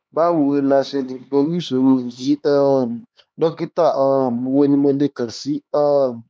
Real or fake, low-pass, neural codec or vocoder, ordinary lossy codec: fake; none; codec, 16 kHz, 2 kbps, X-Codec, HuBERT features, trained on LibriSpeech; none